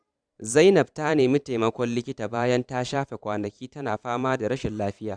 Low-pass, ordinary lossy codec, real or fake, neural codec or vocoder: 9.9 kHz; Opus, 64 kbps; fake; vocoder, 44.1 kHz, 128 mel bands every 512 samples, BigVGAN v2